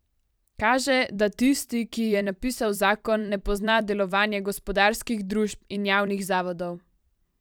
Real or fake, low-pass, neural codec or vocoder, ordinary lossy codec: fake; none; vocoder, 44.1 kHz, 128 mel bands every 256 samples, BigVGAN v2; none